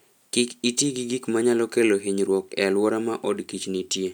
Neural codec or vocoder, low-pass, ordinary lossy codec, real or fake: none; none; none; real